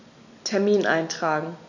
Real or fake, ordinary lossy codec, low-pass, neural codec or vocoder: real; none; 7.2 kHz; none